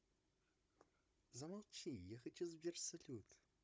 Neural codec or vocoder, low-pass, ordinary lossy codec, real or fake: codec, 16 kHz, 16 kbps, FunCodec, trained on Chinese and English, 50 frames a second; none; none; fake